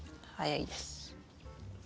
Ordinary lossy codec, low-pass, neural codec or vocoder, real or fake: none; none; none; real